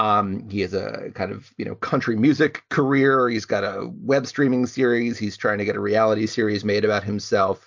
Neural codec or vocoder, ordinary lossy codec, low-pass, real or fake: none; MP3, 64 kbps; 7.2 kHz; real